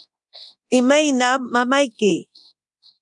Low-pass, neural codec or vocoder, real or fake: 10.8 kHz; codec, 24 kHz, 0.9 kbps, DualCodec; fake